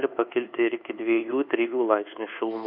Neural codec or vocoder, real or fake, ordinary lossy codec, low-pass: codec, 24 kHz, 1.2 kbps, DualCodec; fake; MP3, 48 kbps; 5.4 kHz